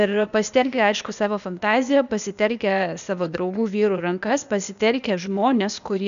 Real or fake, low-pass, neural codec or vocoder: fake; 7.2 kHz; codec, 16 kHz, 0.8 kbps, ZipCodec